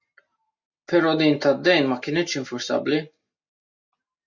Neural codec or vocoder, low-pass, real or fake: none; 7.2 kHz; real